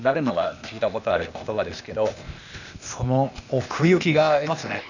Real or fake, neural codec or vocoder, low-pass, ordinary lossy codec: fake; codec, 16 kHz, 0.8 kbps, ZipCodec; 7.2 kHz; none